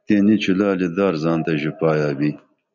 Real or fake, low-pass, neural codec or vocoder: real; 7.2 kHz; none